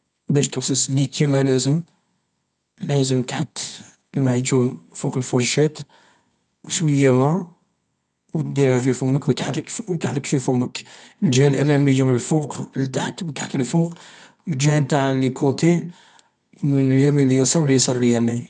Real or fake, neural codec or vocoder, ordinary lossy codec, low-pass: fake; codec, 24 kHz, 0.9 kbps, WavTokenizer, medium music audio release; none; none